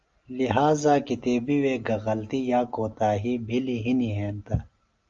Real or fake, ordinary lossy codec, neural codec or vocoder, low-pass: real; Opus, 32 kbps; none; 7.2 kHz